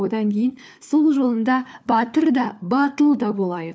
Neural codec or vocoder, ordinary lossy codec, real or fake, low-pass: codec, 16 kHz, 4 kbps, FunCodec, trained on LibriTTS, 50 frames a second; none; fake; none